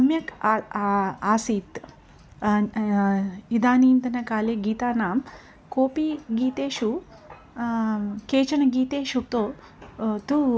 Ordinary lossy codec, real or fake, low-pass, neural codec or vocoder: none; real; none; none